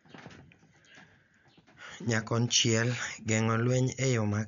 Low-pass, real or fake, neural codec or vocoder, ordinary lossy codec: 7.2 kHz; real; none; none